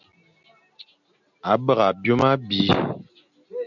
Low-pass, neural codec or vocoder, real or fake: 7.2 kHz; none; real